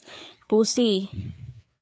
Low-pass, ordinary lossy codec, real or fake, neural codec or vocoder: none; none; fake; codec, 16 kHz, 4 kbps, FreqCodec, larger model